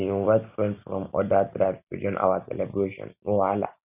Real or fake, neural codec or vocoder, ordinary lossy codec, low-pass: real; none; none; 3.6 kHz